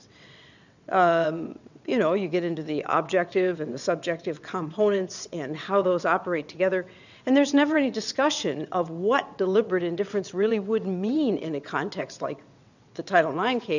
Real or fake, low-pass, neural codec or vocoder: fake; 7.2 kHz; vocoder, 22.05 kHz, 80 mel bands, WaveNeXt